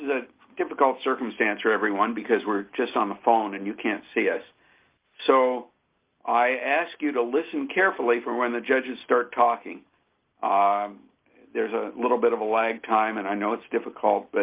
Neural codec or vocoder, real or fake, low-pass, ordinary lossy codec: none; real; 3.6 kHz; Opus, 24 kbps